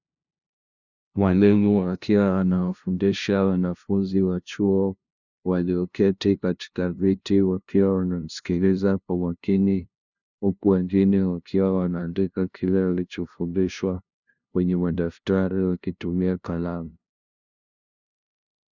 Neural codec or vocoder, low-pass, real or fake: codec, 16 kHz, 0.5 kbps, FunCodec, trained on LibriTTS, 25 frames a second; 7.2 kHz; fake